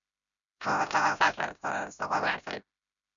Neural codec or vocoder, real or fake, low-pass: codec, 16 kHz, 0.5 kbps, FreqCodec, smaller model; fake; 7.2 kHz